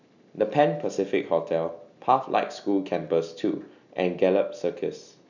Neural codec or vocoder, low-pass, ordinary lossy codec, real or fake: none; 7.2 kHz; none; real